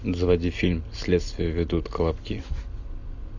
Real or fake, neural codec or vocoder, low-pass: real; none; 7.2 kHz